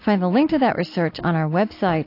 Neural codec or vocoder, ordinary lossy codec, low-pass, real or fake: none; AAC, 32 kbps; 5.4 kHz; real